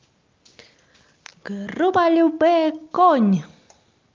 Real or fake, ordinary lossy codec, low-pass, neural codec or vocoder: real; Opus, 24 kbps; 7.2 kHz; none